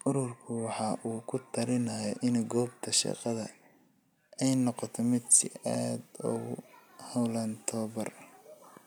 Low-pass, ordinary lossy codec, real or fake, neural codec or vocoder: none; none; real; none